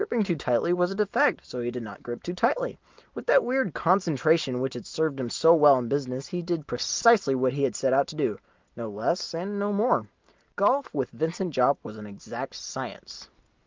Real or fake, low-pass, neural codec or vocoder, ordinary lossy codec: real; 7.2 kHz; none; Opus, 24 kbps